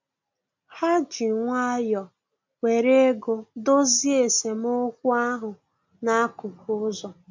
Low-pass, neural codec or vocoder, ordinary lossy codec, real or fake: 7.2 kHz; none; MP3, 48 kbps; real